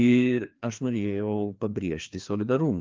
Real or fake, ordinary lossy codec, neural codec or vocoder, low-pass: fake; Opus, 24 kbps; codec, 16 kHz, 2 kbps, FreqCodec, larger model; 7.2 kHz